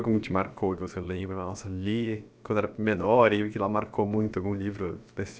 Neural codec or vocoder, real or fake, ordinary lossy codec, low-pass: codec, 16 kHz, about 1 kbps, DyCAST, with the encoder's durations; fake; none; none